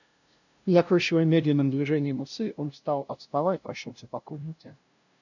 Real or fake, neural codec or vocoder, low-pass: fake; codec, 16 kHz, 0.5 kbps, FunCodec, trained on LibriTTS, 25 frames a second; 7.2 kHz